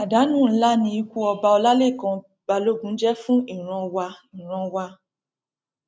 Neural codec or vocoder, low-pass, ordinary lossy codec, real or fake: none; none; none; real